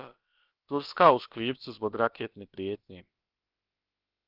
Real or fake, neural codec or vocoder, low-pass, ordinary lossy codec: fake; codec, 16 kHz, about 1 kbps, DyCAST, with the encoder's durations; 5.4 kHz; Opus, 32 kbps